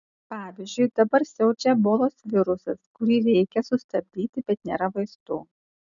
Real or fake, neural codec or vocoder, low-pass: real; none; 7.2 kHz